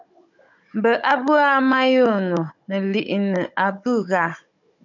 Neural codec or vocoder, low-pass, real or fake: codec, 16 kHz, 16 kbps, FunCodec, trained on Chinese and English, 50 frames a second; 7.2 kHz; fake